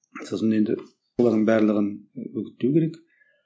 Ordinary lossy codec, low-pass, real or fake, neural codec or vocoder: none; none; real; none